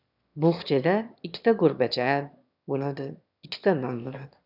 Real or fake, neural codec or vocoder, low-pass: fake; autoencoder, 22.05 kHz, a latent of 192 numbers a frame, VITS, trained on one speaker; 5.4 kHz